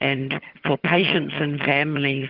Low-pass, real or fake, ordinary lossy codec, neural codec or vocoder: 5.4 kHz; fake; Opus, 16 kbps; vocoder, 22.05 kHz, 80 mel bands, HiFi-GAN